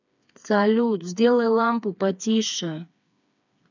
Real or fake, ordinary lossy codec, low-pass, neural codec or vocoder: fake; none; 7.2 kHz; codec, 16 kHz, 4 kbps, FreqCodec, smaller model